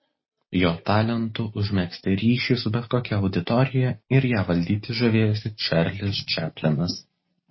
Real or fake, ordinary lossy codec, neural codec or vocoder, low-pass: real; MP3, 24 kbps; none; 7.2 kHz